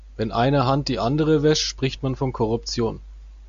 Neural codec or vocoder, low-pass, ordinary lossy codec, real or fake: none; 7.2 kHz; MP3, 64 kbps; real